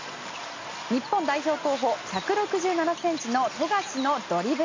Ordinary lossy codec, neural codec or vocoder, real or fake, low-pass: MP3, 64 kbps; none; real; 7.2 kHz